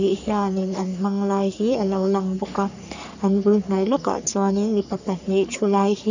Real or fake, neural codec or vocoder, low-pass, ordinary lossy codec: fake; codec, 44.1 kHz, 3.4 kbps, Pupu-Codec; 7.2 kHz; none